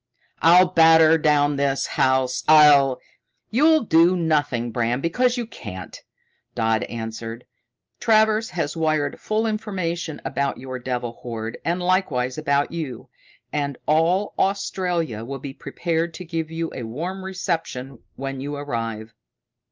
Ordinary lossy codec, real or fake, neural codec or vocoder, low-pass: Opus, 24 kbps; real; none; 7.2 kHz